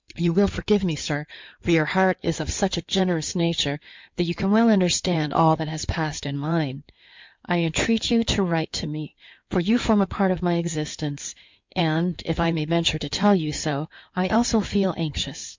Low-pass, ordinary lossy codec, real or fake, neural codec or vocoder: 7.2 kHz; MP3, 64 kbps; fake; codec, 16 kHz in and 24 kHz out, 2.2 kbps, FireRedTTS-2 codec